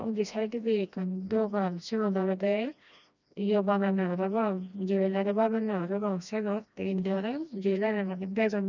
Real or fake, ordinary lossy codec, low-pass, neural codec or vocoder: fake; none; 7.2 kHz; codec, 16 kHz, 1 kbps, FreqCodec, smaller model